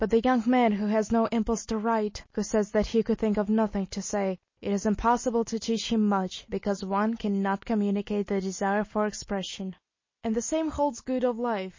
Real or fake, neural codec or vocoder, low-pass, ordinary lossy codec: real; none; 7.2 kHz; MP3, 32 kbps